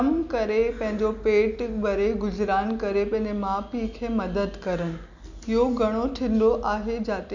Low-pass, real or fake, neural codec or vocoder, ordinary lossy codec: 7.2 kHz; real; none; none